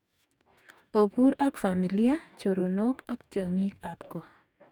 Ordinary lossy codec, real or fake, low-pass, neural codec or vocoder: none; fake; 19.8 kHz; codec, 44.1 kHz, 2.6 kbps, DAC